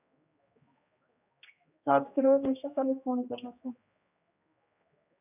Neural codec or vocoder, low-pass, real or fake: codec, 16 kHz, 1 kbps, X-Codec, HuBERT features, trained on general audio; 3.6 kHz; fake